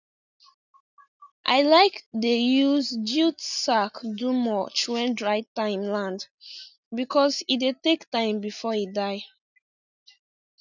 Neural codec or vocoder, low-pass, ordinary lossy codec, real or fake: none; 7.2 kHz; none; real